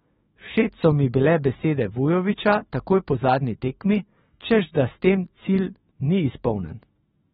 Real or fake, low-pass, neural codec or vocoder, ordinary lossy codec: fake; 19.8 kHz; autoencoder, 48 kHz, 128 numbers a frame, DAC-VAE, trained on Japanese speech; AAC, 16 kbps